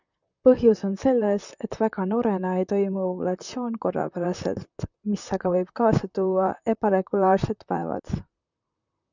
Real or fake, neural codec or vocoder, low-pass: fake; vocoder, 44.1 kHz, 128 mel bands, Pupu-Vocoder; 7.2 kHz